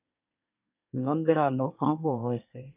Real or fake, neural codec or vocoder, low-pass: fake; codec, 24 kHz, 1 kbps, SNAC; 3.6 kHz